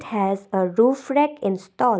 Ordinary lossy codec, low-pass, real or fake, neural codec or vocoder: none; none; real; none